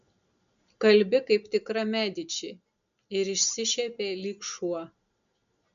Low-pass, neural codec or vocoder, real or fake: 7.2 kHz; none; real